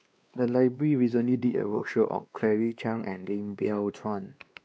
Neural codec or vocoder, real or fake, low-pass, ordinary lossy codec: codec, 16 kHz, 2 kbps, X-Codec, WavLM features, trained on Multilingual LibriSpeech; fake; none; none